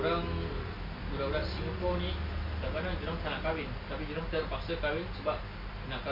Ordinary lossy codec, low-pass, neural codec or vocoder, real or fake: MP3, 32 kbps; 5.4 kHz; none; real